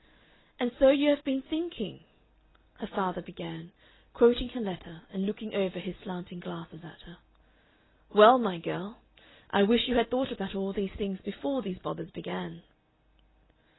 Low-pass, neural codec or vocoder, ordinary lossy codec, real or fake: 7.2 kHz; none; AAC, 16 kbps; real